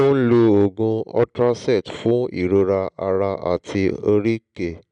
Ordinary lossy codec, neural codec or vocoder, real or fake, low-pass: none; none; real; 9.9 kHz